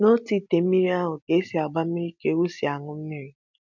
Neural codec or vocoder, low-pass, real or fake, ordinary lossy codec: none; 7.2 kHz; real; MP3, 64 kbps